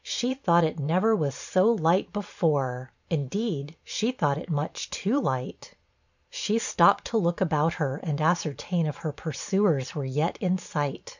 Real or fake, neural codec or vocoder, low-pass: fake; vocoder, 44.1 kHz, 128 mel bands every 512 samples, BigVGAN v2; 7.2 kHz